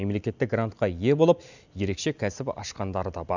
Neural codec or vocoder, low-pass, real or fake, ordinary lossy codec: none; 7.2 kHz; real; none